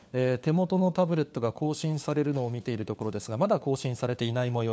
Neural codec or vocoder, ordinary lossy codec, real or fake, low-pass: codec, 16 kHz, 4 kbps, FunCodec, trained on LibriTTS, 50 frames a second; none; fake; none